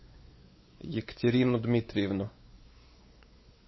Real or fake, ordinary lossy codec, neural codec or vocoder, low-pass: fake; MP3, 24 kbps; codec, 16 kHz, 4 kbps, X-Codec, WavLM features, trained on Multilingual LibriSpeech; 7.2 kHz